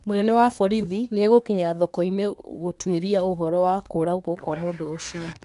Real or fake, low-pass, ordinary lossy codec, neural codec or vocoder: fake; 10.8 kHz; none; codec, 24 kHz, 1 kbps, SNAC